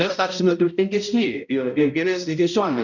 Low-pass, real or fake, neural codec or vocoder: 7.2 kHz; fake; codec, 16 kHz, 0.5 kbps, X-Codec, HuBERT features, trained on balanced general audio